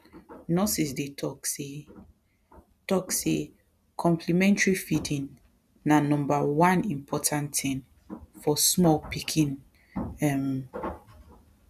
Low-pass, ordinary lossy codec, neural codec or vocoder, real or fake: 14.4 kHz; none; none; real